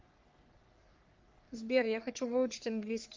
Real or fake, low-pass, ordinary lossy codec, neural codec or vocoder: fake; 7.2 kHz; Opus, 32 kbps; codec, 44.1 kHz, 3.4 kbps, Pupu-Codec